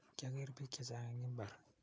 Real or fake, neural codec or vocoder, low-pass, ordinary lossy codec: real; none; none; none